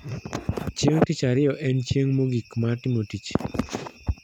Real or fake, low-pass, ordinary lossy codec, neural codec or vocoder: real; 19.8 kHz; none; none